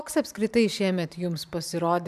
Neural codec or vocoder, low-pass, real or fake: vocoder, 44.1 kHz, 128 mel bands every 512 samples, BigVGAN v2; 14.4 kHz; fake